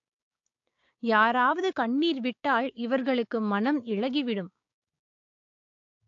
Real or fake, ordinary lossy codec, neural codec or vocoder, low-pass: fake; AAC, 48 kbps; codec, 16 kHz, 6 kbps, DAC; 7.2 kHz